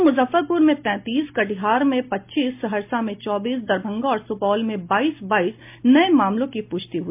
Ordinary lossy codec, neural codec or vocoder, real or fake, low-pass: MP3, 32 kbps; none; real; 3.6 kHz